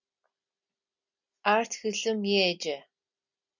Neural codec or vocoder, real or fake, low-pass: none; real; 7.2 kHz